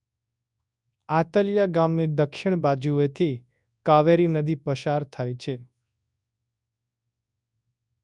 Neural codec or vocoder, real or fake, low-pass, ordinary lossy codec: codec, 24 kHz, 0.9 kbps, WavTokenizer, large speech release; fake; 10.8 kHz; none